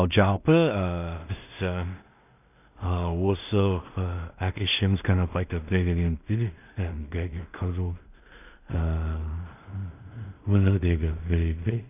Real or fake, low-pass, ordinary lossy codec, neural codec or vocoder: fake; 3.6 kHz; none; codec, 16 kHz in and 24 kHz out, 0.4 kbps, LongCat-Audio-Codec, two codebook decoder